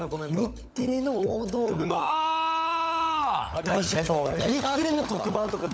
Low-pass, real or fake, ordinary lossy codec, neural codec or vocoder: none; fake; none; codec, 16 kHz, 16 kbps, FunCodec, trained on LibriTTS, 50 frames a second